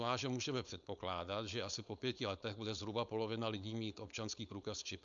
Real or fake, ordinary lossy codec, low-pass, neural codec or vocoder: fake; MP3, 64 kbps; 7.2 kHz; codec, 16 kHz, 4.8 kbps, FACodec